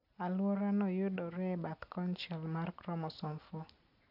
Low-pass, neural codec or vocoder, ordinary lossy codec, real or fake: 5.4 kHz; none; none; real